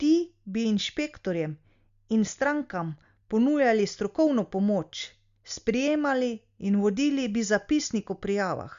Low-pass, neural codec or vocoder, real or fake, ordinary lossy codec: 7.2 kHz; none; real; Opus, 64 kbps